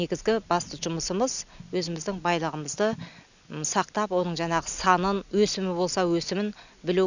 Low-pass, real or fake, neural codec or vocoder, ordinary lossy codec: 7.2 kHz; real; none; none